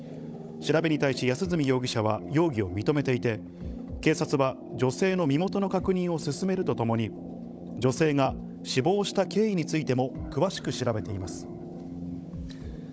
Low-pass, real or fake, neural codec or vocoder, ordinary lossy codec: none; fake; codec, 16 kHz, 16 kbps, FunCodec, trained on Chinese and English, 50 frames a second; none